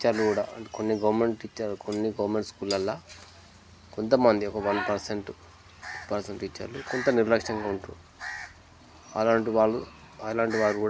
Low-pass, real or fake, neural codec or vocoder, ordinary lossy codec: none; real; none; none